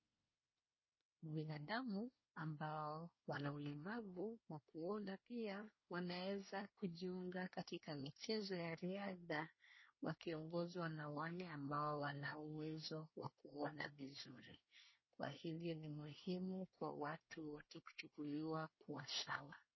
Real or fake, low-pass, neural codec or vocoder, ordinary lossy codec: fake; 7.2 kHz; codec, 24 kHz, 1 kbps, SNAC; MP3, 24 kbps